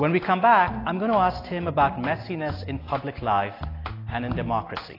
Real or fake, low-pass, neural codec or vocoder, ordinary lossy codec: real; 5.4 kHz; none; AAC, 24 kbps